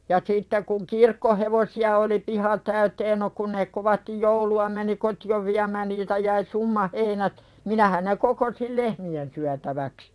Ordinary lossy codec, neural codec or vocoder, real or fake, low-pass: none; vocoder, 22.05 kHz, 80 mel bands, WaveNeXt; fake; none